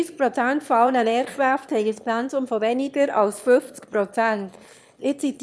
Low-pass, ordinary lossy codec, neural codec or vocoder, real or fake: none; none; autoencoder, 22.05 kHz, a latent of 192 numbers a frame, VITS, trained on one speaker; fake